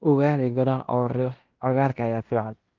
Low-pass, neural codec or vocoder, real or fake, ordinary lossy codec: 7.2 kHz; codec, 16 kHz in and 24 kHz out, 0.9 kbps, LongCat-Audio-Codec, fine tuned four codebook decoder; fake; Opus, 24 kbps